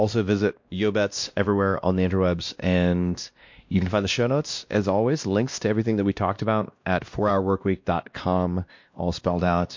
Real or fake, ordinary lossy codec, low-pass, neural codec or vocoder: fake; MP3, 48 kbps; 7.2 kHz; codec, 16 kHz, 1 kbps, X-Codec, WavLM features, trained on Multilingual LibriSpeech